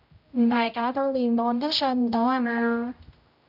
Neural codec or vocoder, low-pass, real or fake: codec, 16 kHz, 0.5 kbps, X-Codec, HuBERT features, trained on general audio; 5.4 kHz; fake